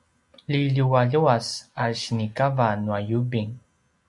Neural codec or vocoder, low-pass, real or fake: none; 10.8 kHz; real